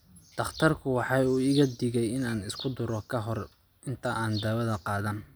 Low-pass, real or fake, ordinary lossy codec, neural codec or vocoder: none; real; none; none